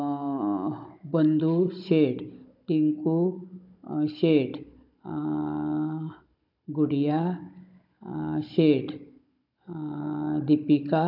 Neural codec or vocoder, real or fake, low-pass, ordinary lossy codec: codec, 16 kHz, 16 kbps, FunCodec, trained on Chinese and English, 50 frames a second; fake; 5.4 kHz; none